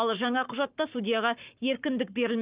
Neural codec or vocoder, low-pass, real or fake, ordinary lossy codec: codec, 44.1 kHz, 7.8 kbps, Pupu-Codec; 3.6 kHz; fake; Opus, 24 kbps